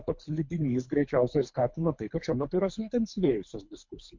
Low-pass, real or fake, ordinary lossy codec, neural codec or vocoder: 7.2 kHz; fake; MP3, 48 kbps; codec, 24 kHz, 3 kbps, HILCodec